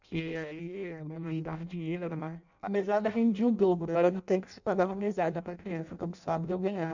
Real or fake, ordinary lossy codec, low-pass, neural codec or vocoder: fake; none; 7.2 kHz; codec, 16 kHz in and 24 kHz out, 0.6 kbps, FireRedTTS-2 codec